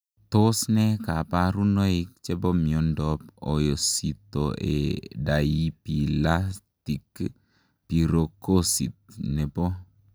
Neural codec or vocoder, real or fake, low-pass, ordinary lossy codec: none; real; none; none